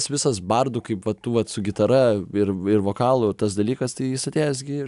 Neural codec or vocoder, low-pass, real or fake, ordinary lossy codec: none; 10.8 kHz; real; AAC, 96 kbps